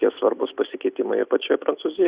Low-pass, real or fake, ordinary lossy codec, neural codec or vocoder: 3.6 kHz; real; Opus, 64 kbps; none